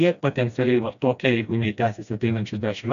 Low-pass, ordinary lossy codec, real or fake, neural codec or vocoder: 7.2 kHz; AAC, 96 kbps; fake; codec, 16 kHz, 1 kbps, FreqCodec, smaller model